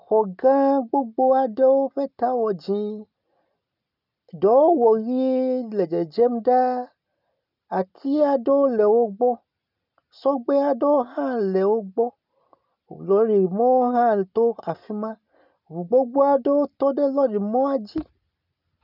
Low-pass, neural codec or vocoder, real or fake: 5.4 kHz; none; real